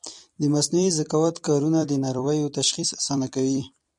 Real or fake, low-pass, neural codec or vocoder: fake; 10.8 kHz; vocoder, 44.1 kHz, 128 mel bands every 256 samples, BigVGAN v2